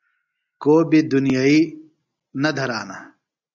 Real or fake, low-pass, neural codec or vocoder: real; 7.2 kHz; none